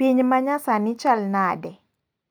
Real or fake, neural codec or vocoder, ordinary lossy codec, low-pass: real; none; none; none